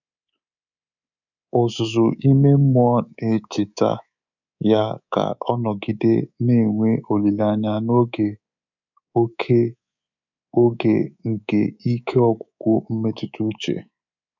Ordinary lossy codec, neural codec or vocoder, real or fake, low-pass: none; codec, 24 kHz, 3.1 kbps, DualCodec; fake; 7.2 kHz